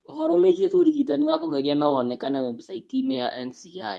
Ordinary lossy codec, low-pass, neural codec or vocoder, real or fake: none; none; codec, 24 kHz, 0.9 kbps, WavTokenizer, medium speech release version 2; fake